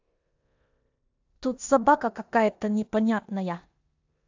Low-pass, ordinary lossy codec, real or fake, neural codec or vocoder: 7.2 kHz; AAC, 48 kbps; fake; codec, 16 kHz in and 24 kHz out, 0.9 kbps, LongCat-Audio-Codec, four codebook decoder